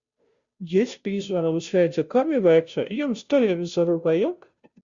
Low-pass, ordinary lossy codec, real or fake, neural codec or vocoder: 7.2 kHz; Opus, 64 kbps; fake; codec, 16 kHz, 0.5 kbps, FunCodec, trained on Chinese and English, 25 frames a second